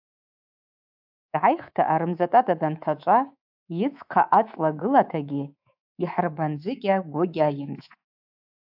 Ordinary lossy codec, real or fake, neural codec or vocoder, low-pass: AAC, 48 kbps; fake; codec, 24 kHz, 3.1 kbps, DualCodec; 5.4 kHz